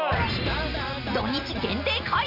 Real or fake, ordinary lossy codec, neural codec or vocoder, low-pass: real; none; none; 5.4 kHz